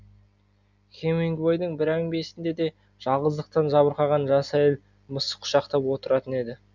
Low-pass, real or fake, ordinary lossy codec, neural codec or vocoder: 7.2 kHz; real; none; none